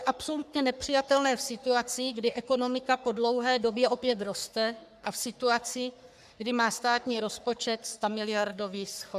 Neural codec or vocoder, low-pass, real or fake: codec, 44.1 kHz, 3.4 kbps, Pupu-Codec; 14.4 kHz; fake